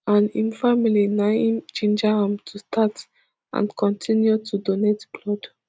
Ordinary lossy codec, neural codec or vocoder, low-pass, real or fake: none; none; none; real